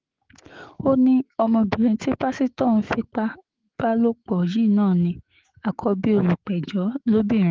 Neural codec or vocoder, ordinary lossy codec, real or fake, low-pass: autoencoder, 48 kHz, 128 numbers a frame, DAC-VAE, trained on Japanese speech; Opus, 16 kbps; fake; 7.2 kHz